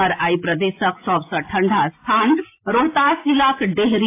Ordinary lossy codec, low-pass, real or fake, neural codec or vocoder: AAC, 24 kbps; 3.6 kHz; real; none